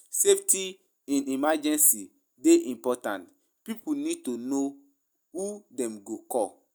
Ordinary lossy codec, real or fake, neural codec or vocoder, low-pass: none; real; none; none